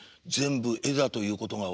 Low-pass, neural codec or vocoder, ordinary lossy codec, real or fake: none; none; none; real